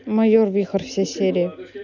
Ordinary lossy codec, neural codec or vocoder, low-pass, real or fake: none; none; 7.2 kHz; real